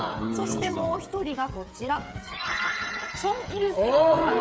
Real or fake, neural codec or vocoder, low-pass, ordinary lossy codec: fake; codec, 16 kHz, 8 kbps, FreqCodec, smaller model; none; none